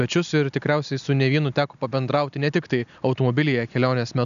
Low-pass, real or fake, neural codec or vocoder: 7.2 kHz; real; none